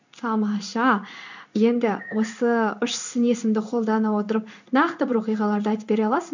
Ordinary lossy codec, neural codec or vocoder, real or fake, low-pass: none; codec, 16 kHz in and 24 kHz out, 1 kbps, XY-Tokenizer; fake; 7.2 kHz